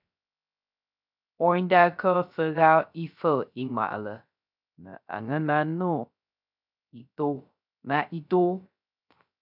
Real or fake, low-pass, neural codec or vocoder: fake; 5.4 kHz; codec, 16 kHz, 0.3 kbps, FocalCodec